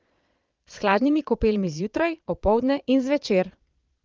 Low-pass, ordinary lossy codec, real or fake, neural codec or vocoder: 7.2 kHz; Opus, 32 kbps; fake; vocoder, 22.05 kHz, 80 mel bands, Vocos